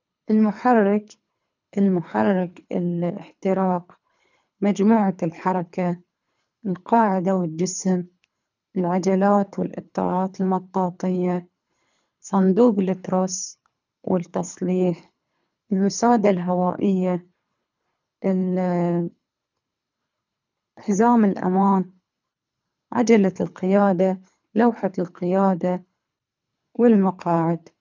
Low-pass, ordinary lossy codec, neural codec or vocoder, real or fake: 7.2 kHz; none; codec, 24 kHz, 3 kbps, HILCodec; fake